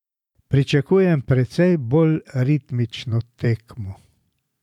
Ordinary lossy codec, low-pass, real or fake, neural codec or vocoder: none; 19.8 kHz; fake; vocoder, 44.1 kHz, 128 mel bands every 512 samples, BigVGAN v2